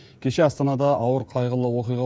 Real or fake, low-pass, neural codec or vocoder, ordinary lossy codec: fake; none; codec, 16 kHz, 16 kbps, FreqCodec, smaller model; none